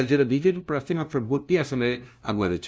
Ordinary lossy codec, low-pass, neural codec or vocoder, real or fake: none; none; codec, 16 kHz, 0.5 kbps, FunCodec, trained on LibriTTS, 25 frames a second; fake